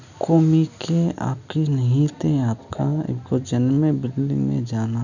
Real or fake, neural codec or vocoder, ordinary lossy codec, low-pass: real; none; none; 7.2 kHz